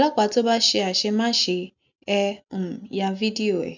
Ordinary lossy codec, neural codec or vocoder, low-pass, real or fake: none; none; 7.2 kHz; real